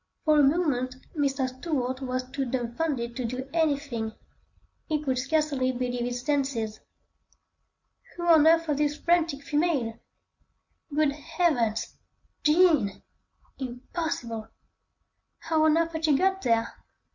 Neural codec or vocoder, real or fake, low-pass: none; real; 7.2 kHz